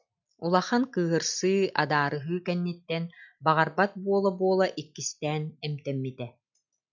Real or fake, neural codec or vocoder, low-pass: real; none; 7.2 kHz